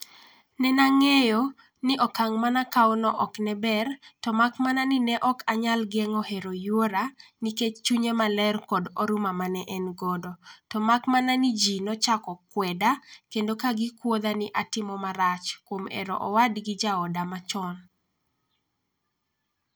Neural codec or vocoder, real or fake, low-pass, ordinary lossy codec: none; real; none; none